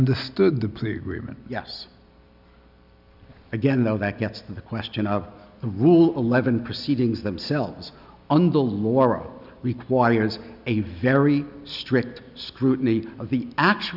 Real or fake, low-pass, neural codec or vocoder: real; 5.4 kHz; none